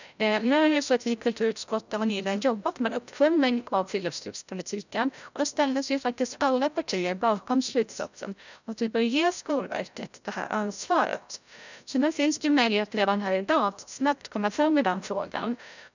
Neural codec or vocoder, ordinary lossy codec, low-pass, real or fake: codec, 16 kHz, 0.5 kbps, FreqCodec, larger model; none; 7.2 kHz; fake